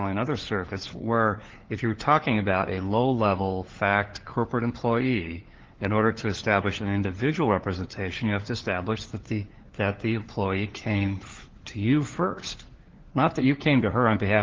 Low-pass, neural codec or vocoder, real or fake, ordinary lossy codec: 7.2 kHz; codec, 16 kHz, 4 kbps, FunCodec, trained on LibriTTS, 50 frames a second; fake; Opus, 16 kbps